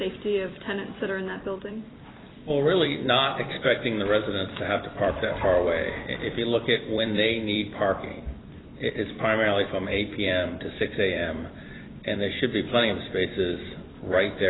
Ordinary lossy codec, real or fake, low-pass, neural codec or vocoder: AAC, 16 kbps; fake; 7.2 kHz; vocoder, 44.1 kHz, 128 mel bands every 256 samples, BigVGAN v2